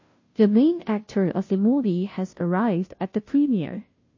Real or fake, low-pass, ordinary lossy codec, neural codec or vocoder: fake; 7.2 kHz; MP3, 32 kbps; codec, 16 kHz, 0.5 kbps, FunCodec, trained on Chinese and English, 25 frames a second